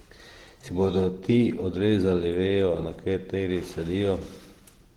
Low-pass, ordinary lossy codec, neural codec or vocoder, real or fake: 19.8 kHz; Opus, 16 kbps; vocoder, 48 kHz, 128 mel bands, Vocos; fake